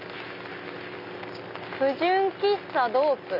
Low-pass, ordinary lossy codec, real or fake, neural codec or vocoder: 5.4 kHz; none; real; none